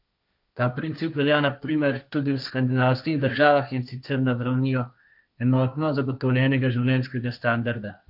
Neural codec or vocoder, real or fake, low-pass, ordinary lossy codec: codec, 16 kHz, 1.1 kbps, Voila-Tokenizer; fake; 5.4 kHz; none